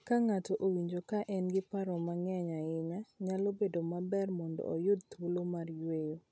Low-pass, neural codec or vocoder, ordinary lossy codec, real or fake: none; none; none; real